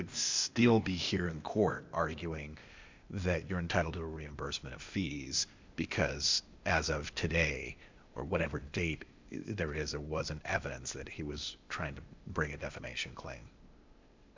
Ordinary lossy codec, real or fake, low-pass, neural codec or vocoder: MP3, 64 kbps; fake; 7.2 kHz; codec, 16 kHz, 0.8 kbps, ZipCodec